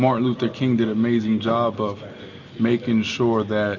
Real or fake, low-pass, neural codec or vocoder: real; 7.2 kHz; none